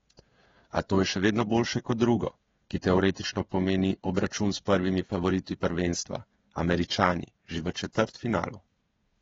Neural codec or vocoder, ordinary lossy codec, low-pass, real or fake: codec, 16 kHz, 16 kbps, FreqCodec, smaller model; AAC, 24 kbps; 7.2 kHz; fake